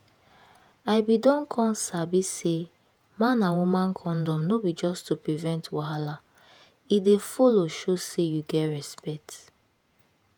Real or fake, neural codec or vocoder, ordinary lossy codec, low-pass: fake; vocoder, 48 kHz, 128 mel bands, Vocos; none; none